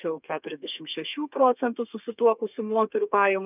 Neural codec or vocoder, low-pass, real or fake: codec, 32 kHz, 1.9 kbps, SNAC; 3.6 kHz; fake